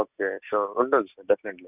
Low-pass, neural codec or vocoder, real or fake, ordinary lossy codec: 3.6 kHz; none; real; none